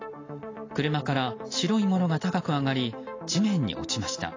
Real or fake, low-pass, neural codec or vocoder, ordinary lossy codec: real; 7.2 kHz; none; AAC, 32 kbps